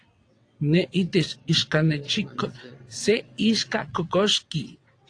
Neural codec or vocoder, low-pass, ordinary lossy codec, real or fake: vocoder, 22.05 kHz, 80 mel bands, WaveNeXt; 9.9 kHz; AAC, 48 kbps; fake